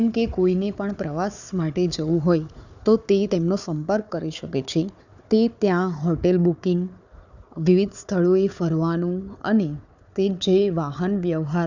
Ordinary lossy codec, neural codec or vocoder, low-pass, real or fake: none; codec, 16 kHz, 4 kbps, FunCodec, trained on Chinese and English, 50 frames a second; 7.2 kHz; fake